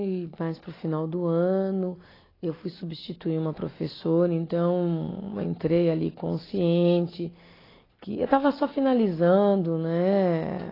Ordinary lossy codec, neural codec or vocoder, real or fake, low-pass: AAC, 24 kbps; none; real; 5.4 kHz